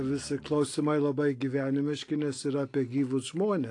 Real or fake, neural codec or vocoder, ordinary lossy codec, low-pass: real; none; AAC, 48 kbps; 10.8 kHz